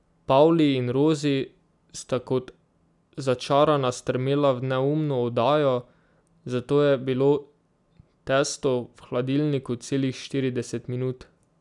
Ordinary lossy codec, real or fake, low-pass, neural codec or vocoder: none; real; 10.8 kHz; none